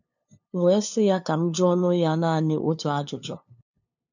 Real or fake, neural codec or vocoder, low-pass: fake; codec, 16 kHz, 2 kbps, FunCodec, trained on LibriTTS, 25 frames a second; 7.2 kHz